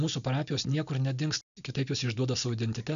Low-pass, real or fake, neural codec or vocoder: 7.2 kHz; real; none